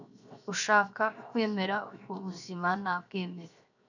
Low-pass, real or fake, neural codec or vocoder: 7.2 kHz; fake; codec, 16 kHz, 0.7 kbps, FocalCodec